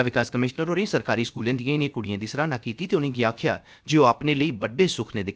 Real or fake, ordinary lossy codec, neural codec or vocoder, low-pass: fake; none; codec, 16 kHz, about 1 kbps, DyCAST, with the encoder's durations; none